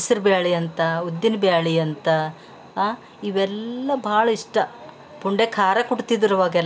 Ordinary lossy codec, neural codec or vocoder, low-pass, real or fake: none; none; none; real